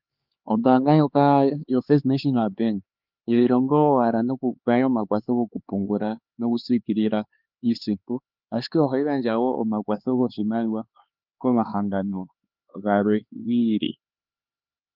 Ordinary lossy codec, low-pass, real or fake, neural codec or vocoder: Opus, 24 kbps; 5.4 kHz; fake; codec, 16 kHz, 4 kbps, X-Codec, HuBERT features, trained on LibriSpeech